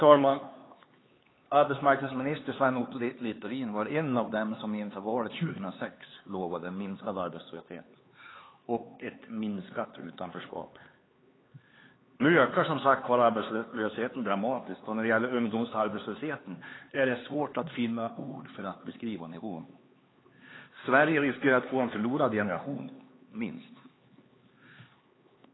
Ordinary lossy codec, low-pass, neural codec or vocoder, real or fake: AAC, 16 kbps; 7.2 kHz; codec, 16 kHz, 4 kbps, X-Codec, HuBERT features, trained on LibriSpeech; fake